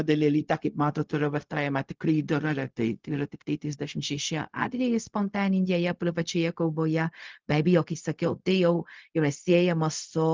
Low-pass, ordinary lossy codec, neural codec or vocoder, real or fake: 7.2 kHz; Opus, 32 kbps; codec, 16 kHz, 0.4 kbps, LongCat-Audio-Codec; fake